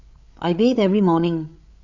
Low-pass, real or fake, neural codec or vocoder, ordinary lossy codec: 7.2 kHz; fake; codec, 16 kHz, 8 kbps, FreqCodec, larger model; Opus, 64 kbps